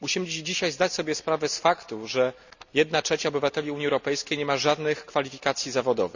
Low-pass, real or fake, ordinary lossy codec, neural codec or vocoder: 7.2 kHz; real; none; none